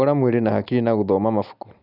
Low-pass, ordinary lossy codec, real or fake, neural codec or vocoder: 5.4 kHz; none; real; none